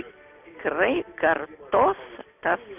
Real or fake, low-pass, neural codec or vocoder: real; 3.6 kHz; none